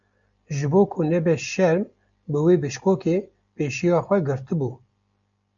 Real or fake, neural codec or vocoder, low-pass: real; none; 7.2 kHz